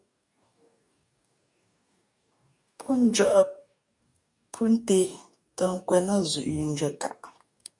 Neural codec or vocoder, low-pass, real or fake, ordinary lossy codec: codec, 44.1 kHz, 2.6 kbps, DAC; 10.8 kHz; fake; MP3, 96 kbps